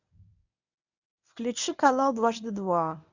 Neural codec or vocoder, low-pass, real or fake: codec, 24 kHz, 0.9 kbps, WavTokenizer, medium speech release version 1; 7.2 kHz; fake